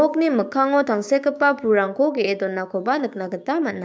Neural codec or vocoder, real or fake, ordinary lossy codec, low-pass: codec, 16 kHz, 6 kbps, DAC; fake; none; none